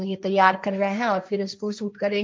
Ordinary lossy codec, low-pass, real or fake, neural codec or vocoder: none; none; fake; codec, 16 kHz, 1.1 kbps, Voila-Tokenizer